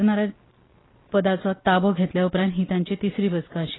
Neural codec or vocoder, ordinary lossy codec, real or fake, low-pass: none; AAC, 16 kbps; real; 7.2 kHz